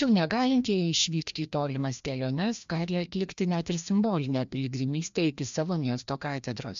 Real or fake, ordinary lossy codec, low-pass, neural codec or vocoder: fake; MP3, 64 kbps; 7.2 kHz; codec, 16 kHz, 1 kbps, FreqCodec, larger model